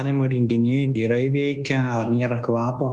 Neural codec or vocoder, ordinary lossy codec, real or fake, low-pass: codec, 24 kHz, 1.2 kbps, DualCodec; Opus, 24 kbps; fake; 10.8 kHz